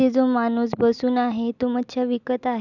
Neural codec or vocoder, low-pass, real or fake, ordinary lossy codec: none; 7.2 kHz; real; none